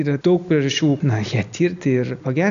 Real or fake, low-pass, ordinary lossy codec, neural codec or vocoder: real; 7.2 kHz; AAC, 96 kbps; none